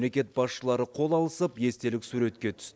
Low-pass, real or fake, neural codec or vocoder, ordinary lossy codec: none; real; none; none